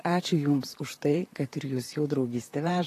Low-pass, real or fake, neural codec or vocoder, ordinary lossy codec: 14.4 kHz; fake; vocoder, 44.1 kHz, 128 mel bands, Pupu-Vocoder; AAC, 48 kbps